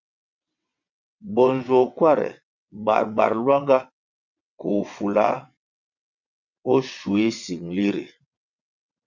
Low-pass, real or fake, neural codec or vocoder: 7.2 kHz; fake; vocoder, 22.05 kHz, 80 mel bands, WaveNeXt